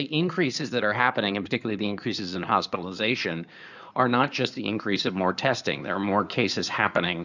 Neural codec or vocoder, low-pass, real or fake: codec, 16 kHz in and 24 kHz out, 2.2 kbps, FireRedTTS-2 codec; 7.2 kHz; fake